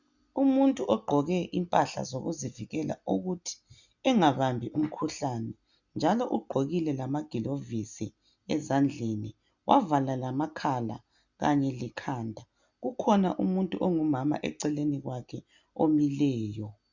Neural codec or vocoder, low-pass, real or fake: none; 7.2 kHz; real